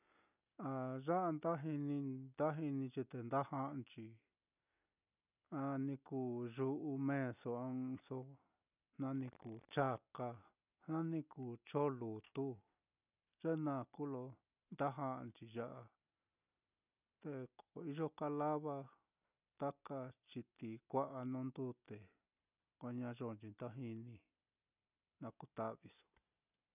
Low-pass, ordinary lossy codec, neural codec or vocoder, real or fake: 3.6 kHz; none; none; real